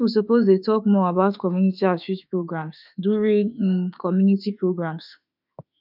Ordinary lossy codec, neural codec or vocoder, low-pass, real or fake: none; autoencoder, 48 kHz, 32 numbers a frame, DAC-VAE, trained on Japanese speech; 5.4 kHz; fake